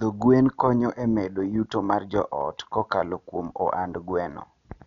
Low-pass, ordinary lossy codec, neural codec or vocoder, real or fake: 7.2 kHz; none; none; real